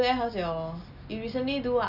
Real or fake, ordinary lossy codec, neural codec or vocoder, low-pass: real; none; none; 5.4 kHz